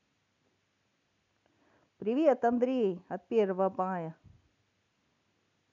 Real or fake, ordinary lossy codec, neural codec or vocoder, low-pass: real; none; none; 7.2 kHz